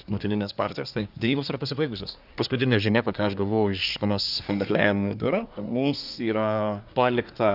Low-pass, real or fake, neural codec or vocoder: 5.4 kHz; fake; codec, 24 kHz, 1 kbps, SNAC